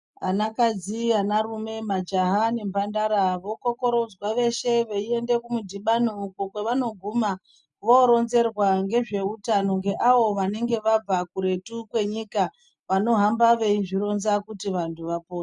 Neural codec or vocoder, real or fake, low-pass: none; real; 10.8 kHz